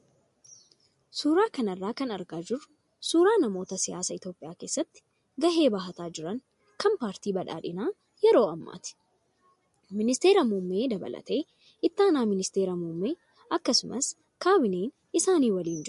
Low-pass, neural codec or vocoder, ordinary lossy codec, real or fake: 14.4 kHz; none; MP3, 48 kbps; real